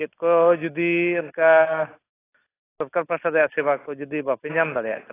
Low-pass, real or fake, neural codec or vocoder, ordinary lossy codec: 3.6 kHz; real; none; AAC, 16 kbps